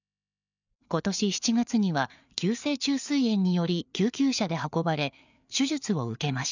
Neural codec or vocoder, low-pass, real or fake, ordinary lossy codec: codec, 16 kHz, 4 kbps, FreqCodec, larger model; 7.2 kHz; fake; none